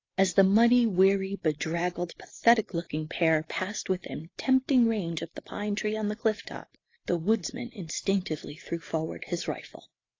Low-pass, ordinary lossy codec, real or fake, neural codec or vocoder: 7.2 kHz; AAC, 32 kbps; real; none